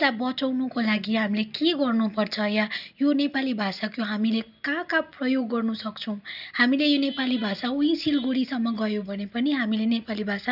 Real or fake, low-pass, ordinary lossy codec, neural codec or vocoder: real; 5.4 kHz; none; none